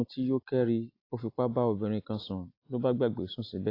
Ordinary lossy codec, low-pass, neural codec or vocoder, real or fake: AAC, 32 kbps; 5.4 kHz; none; real